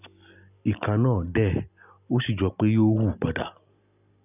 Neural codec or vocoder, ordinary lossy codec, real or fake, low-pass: none; none; real; 3.6 kHz